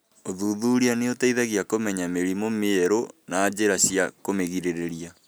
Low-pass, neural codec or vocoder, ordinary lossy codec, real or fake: none; none; none; real